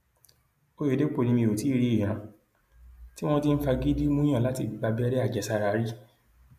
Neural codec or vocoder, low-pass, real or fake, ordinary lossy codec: none; 14.4 kHz; real; AAC, 96 kbps